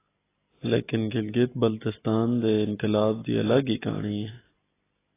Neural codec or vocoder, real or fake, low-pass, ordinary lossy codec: none; real; 3.6 kHz; AAC, 16 kbps